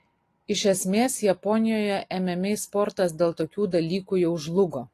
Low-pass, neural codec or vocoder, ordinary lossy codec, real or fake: 14.4 kHz; none; AAC, 48 kbps; real